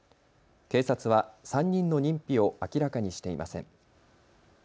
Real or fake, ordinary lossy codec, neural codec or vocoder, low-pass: real; none; none; none